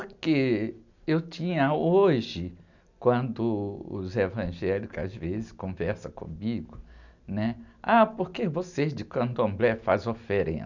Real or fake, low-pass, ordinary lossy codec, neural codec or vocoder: real; 7.2 kHz; none; none